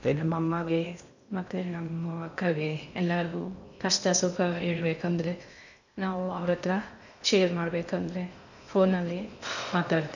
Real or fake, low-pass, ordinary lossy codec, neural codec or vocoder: fake; 7.2 kHz; none; codec, 16 kHz in and 24 kHz out, 0.6 kbps, FocalCodec, streaming, 2048 codes